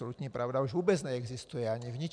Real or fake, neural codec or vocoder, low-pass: real; none; 9.9 kHz